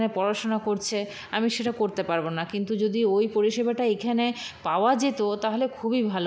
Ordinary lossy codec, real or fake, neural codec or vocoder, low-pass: none; real; none; none